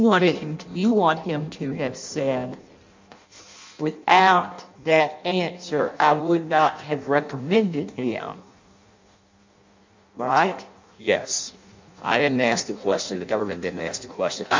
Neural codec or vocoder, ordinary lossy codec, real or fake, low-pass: codec, 16 kHz in and 24 kHz out, 0.6 kbps, FireRedTTS-2 codec; MP3, 64 kbps; fake; 7.2 kHz